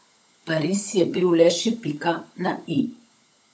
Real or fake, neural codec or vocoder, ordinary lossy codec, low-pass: fake; codec, 16 kHz, 16 kbps, FunCodec, trained on LibriTTS, 50 frames a second; none; none